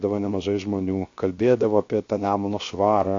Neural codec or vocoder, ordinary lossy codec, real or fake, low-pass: codec, 16 kHz, 0.7 kbps, FocalCodec; AAC, 48 kbps; fake; 7.2 kHz